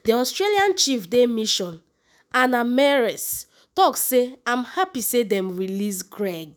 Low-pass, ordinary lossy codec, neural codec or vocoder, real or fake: none; none; autoencoder, 48 kHz, 128 numbers a frame, DAC-VAE, trained on Japanese speech; fake